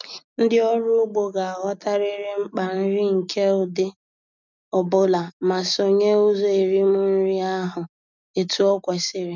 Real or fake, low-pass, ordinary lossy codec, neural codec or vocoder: real; 7.2 kHz; none; none